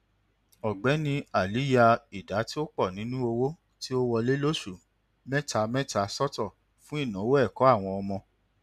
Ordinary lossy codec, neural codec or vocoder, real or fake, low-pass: none; none; real; 14.4 kHz